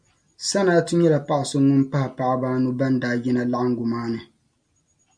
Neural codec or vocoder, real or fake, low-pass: none; real; 9.9 kHz